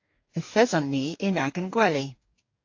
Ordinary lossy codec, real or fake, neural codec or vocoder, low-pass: AAC, 48 kbps; fake; codec, 44.1 kHz, 2.6 kbps, DAC; 7.2 kHz